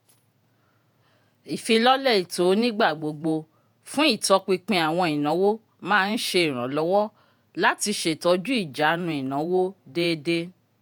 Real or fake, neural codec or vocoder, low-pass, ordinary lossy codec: fake; vocoder, 48 kHz, 128 mel bands, Vocos; none; none